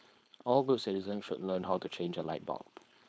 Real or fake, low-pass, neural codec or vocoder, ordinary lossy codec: fake; none; codec, 16 kHz, 4.8 kbps, FACodec; none